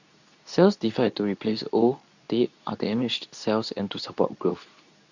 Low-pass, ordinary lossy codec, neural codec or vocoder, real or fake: 7.2 kHz; none; codec, 24 kHz, 0.9 kbps, WavTokenizer, medium speech release version 2; fake